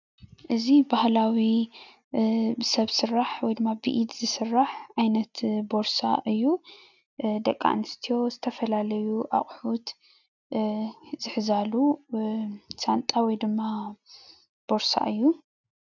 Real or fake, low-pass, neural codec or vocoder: real; 7.2 kHz; none